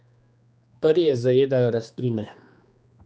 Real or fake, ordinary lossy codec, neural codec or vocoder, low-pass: fake; none; codec, 16 kHz, 2 kbps, X-Codec, HuBERT features, trained on general audio; none